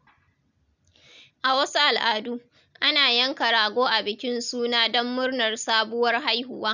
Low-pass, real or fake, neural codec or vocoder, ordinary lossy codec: 7.2 kHz; real; none; none